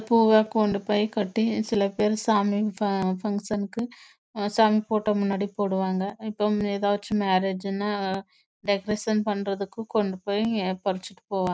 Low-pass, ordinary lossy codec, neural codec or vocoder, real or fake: none; none; none; real